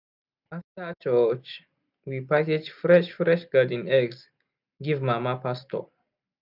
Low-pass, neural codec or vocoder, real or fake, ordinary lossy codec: 5.4 kHz; none; real; none